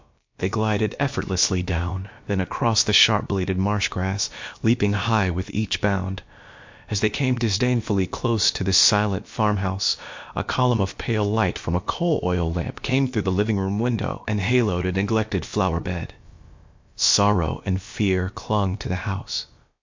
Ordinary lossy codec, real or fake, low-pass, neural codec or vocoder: MP3, 48 kbps; fake; 7.2 kHz; codec, 16 kHz, about 1 kbps, DyCAST, with the encoder's durations